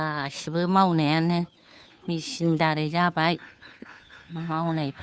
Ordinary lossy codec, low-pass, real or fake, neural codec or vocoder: none; none; fake; codec, 16 kHz, 2 kbps, FunCodec, trained on Chinese and English, 25 frames a second